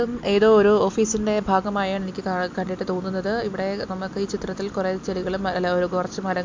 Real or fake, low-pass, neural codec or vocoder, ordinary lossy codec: real; 7.2 kHz; none; MP3, 48 kbps